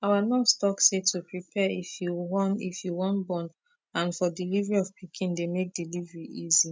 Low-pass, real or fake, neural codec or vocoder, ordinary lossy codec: none; real; none; none